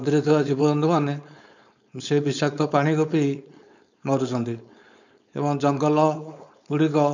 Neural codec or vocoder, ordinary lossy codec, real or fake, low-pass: codec, 16 kHz, 4.8 kbps, FACodec; none; fake; 7.2 kHz